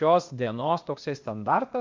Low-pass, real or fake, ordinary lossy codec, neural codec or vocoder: 7.2 kHz; fake; MP3, 48 kbps; codec, 16 kHz, 0.8 kbps, ZipCodec